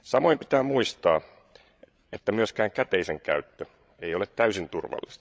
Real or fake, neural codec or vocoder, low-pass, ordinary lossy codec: fake; codec, 16 kHz, 16 kbps, FreqCodec, larger model; none; none